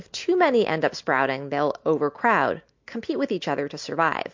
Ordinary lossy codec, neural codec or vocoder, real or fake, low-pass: MP3, 48 kbps; none; real; 7.2 kHz